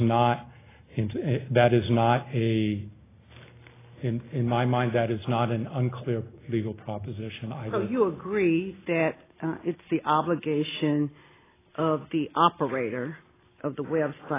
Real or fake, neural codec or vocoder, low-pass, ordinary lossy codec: real; none; 3.6 kHz; AAC, 16 kbps